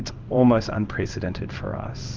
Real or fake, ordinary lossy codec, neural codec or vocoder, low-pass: fake; Opus, 24 kbps; codec, 16 kHz in and 24 kHz out, 1 kbps, XY-Tokenizer; 7.2 kHz